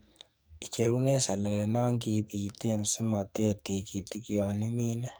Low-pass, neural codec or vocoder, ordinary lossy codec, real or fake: none; codec, 44.1 kHz, 2.6 kbps, SNAC; none; fake